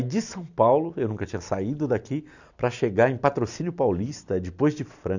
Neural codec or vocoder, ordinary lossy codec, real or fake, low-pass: none; none; real; 7.2 kHz